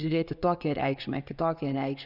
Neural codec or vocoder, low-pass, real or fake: vocoder, 24 kHz, 100 mel bands, Vocos; 5.4 kHz; fake